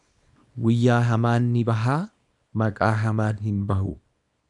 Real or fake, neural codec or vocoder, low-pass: fake; codec, 24 kHz, 0.9 kbps, WavTokenizer, small release; 10.8 kHz